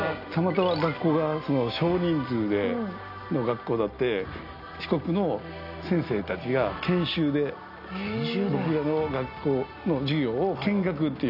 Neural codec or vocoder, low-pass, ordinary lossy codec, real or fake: none; 5.4 kHz; none; real